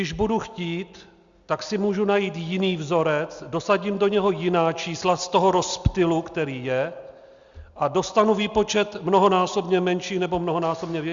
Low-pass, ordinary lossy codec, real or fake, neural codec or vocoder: 7.2 kHz; Opus, 64 kbps; real; none